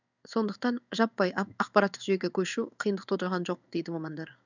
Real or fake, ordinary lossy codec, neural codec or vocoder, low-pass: fake; none; codec, 16 kHz in and 24 kHz out, 1 kbps, XY-Tokenizer; 7.2 kHz